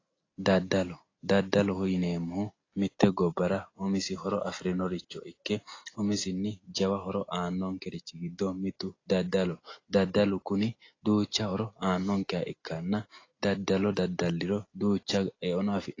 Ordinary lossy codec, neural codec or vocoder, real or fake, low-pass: AAC, 32 kbps; none; real; 7.2 kHz